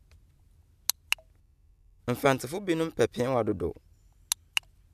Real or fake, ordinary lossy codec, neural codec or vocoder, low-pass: real; none; none; 14.4 kHz